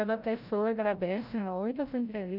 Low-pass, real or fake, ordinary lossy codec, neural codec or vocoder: 5.4 kHz; fake; none; codec, 16 kHz, 0.5 kbps, FreqCodec, larger model